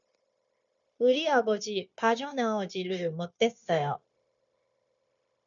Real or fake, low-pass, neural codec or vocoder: fake; 7.2 kHz; codec, 16 kHz, 0.9 kbps, LongCat-Audio-Codec